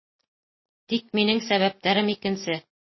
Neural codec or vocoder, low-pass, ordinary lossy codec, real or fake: none; 7.2 kHz; MP3, 24 kbps; real